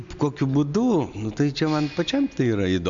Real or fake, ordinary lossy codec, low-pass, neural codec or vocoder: real; MP3, 64 kbps; 7.2 kHz; none